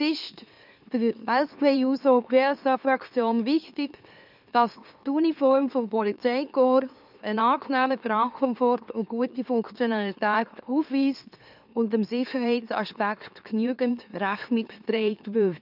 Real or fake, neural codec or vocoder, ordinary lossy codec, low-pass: fake; autoencoder, 44.1 kHz, a latent of 192 numbers a frame, MeloTTS; none; 5.4 kHz